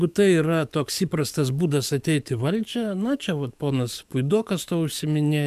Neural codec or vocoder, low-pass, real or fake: codec, 44.1 kHz, 7.8 kbps, DAC; 14.4 kHz; fake